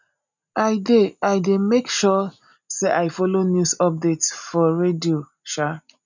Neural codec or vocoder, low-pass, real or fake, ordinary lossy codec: none; 7.2 kHz; real; none